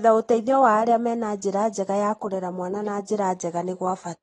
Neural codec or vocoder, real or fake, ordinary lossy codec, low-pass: none; real; AAC, 32 kbps; 10.8 kHz